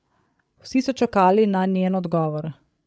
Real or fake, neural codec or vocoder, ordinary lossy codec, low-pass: fake; codec, 16 kHz, 8 kbps, FreqCodec, larger model; none; none